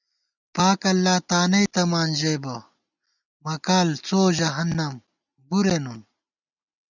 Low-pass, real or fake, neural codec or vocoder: 7.2 kHz; real; none